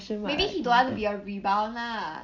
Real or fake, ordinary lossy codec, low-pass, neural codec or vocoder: real; none; 7.2 kHz; none